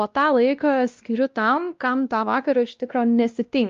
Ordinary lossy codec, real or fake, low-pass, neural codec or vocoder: Opus, 32 kbps; fake; 7.2 kHz; codec, 16 kHz, 1 kbps, X-Codec, WavLM features, trained on Multilingual LibriSpeech